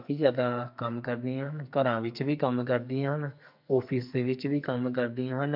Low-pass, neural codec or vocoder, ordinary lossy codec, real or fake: 5.4 kHz; codec, 44.1 kHz, 2.6 kbps, SNAC; MP3, 48 kbps; fake